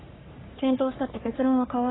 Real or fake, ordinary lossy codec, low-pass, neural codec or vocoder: fake; AAC, 16 kbps; 7.2 kHz; codec, 44.1 kHz, 3.4 kbps, Pupu-Codec